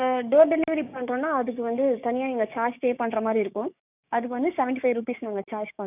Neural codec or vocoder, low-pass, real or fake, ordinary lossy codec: codec, 16 kHz, 6 kbps, DAC; 3.6 kHz; fake; none